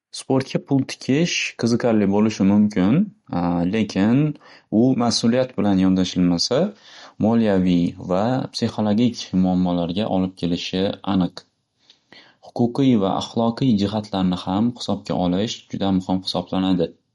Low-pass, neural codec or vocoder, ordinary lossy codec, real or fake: 14.4 kHz; none; MP3, 48 kbps; real